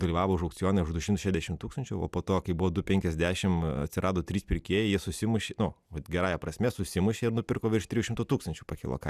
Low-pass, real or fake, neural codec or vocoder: 14.4 kHz; real; none